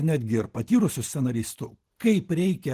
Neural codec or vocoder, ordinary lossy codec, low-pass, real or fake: none; Opus, 16 kbps; 14.4 kHz; real